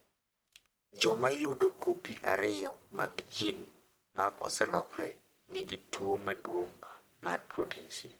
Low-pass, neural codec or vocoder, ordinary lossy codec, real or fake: none; codec, 44.1 kHz, 1.7 kbps, Pupu-Codec; none; fake